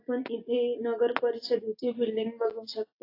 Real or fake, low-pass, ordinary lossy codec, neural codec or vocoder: real; 5.4 kHz; AAC, 32 kbps; none